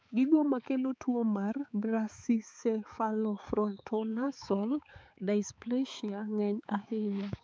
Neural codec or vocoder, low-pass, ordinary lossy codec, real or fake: codec, 16 kHz, 4 kbps, X-Codec, HuBERT features, trained on balanced general audio; none; none; fake